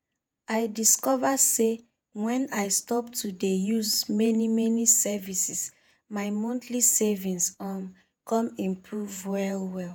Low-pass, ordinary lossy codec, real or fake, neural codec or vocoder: none; none; fake; vocoder, 48 kHz, 128 mel bands, Vocos